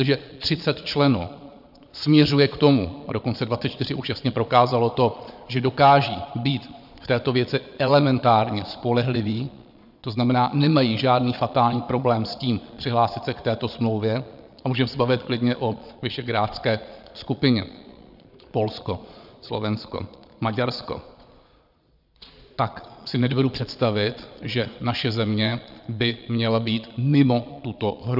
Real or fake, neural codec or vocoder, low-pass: fake; vocoder, 22.05 kHz, 80 mel bands, Vocos; 5.4 kHz